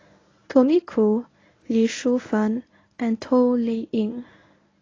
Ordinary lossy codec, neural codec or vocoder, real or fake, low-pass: AAC, 32 kbps; codec, 24 kHz, 0.9 kbps, WavTokenizer, medium speech release version 1; fake; 7.2 kHz